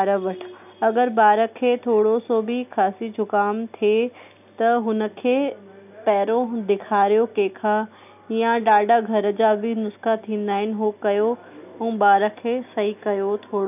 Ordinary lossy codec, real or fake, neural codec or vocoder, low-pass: none; real; none; 3.6 kHz